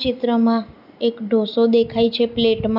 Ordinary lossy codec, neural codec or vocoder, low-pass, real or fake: none; none; 5.4 kHz; real